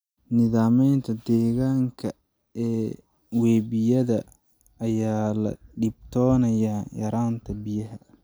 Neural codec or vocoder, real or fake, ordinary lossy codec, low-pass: none; real; none; none